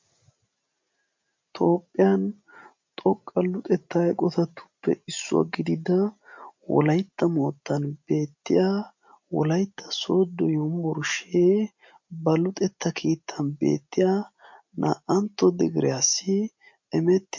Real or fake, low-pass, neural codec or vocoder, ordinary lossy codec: real; 7.2 kHz; none; MP3, 48 kbps